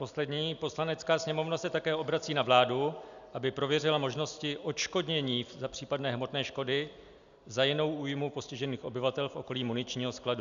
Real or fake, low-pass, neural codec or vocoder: real; 7.2 kHz; none